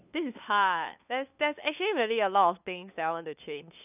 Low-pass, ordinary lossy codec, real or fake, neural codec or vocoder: 3.6 kHz; none; fake; codec, 16 kHz, 2 kbps, FunCodec, trained on LibriTTS, 25 frames a second